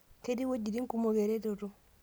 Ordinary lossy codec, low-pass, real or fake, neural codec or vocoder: none; none; real; none